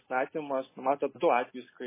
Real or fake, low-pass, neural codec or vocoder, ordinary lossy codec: real; 3.6 kHz; none; MP3, 16 kbps